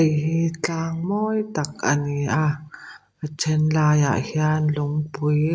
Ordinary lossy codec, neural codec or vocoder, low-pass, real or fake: none; none; none; real